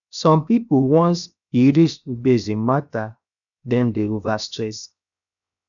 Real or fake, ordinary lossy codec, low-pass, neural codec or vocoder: fake; none; 7.2 kHz; codec, 16 kHz, 0.7 kbps, FocalCodec